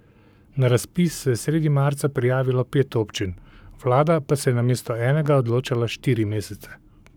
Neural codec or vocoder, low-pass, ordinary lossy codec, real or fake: codec, 44.1 kHz, 7.8 kbps, Pupu-Codec; none; none; fake